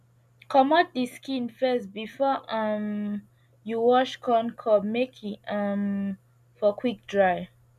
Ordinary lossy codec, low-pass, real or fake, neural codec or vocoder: MP3, 96 kbps; 14.4 kHz; real; none